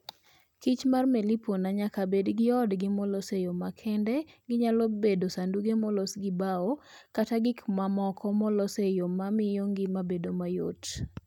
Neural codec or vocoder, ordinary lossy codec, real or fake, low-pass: none; none; real; 19.8 kHz